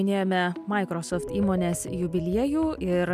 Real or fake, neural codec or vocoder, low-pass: real; none; 14.4 kHz